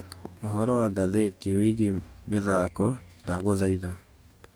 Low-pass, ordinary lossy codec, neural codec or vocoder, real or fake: none; none; codec, 44.1 kHz, 2.6 kbps, DAC; fake